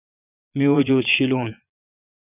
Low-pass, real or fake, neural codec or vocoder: 3.6 kHz; fake; vocoder, 22.05 kHz, 80 mel bands, Vocos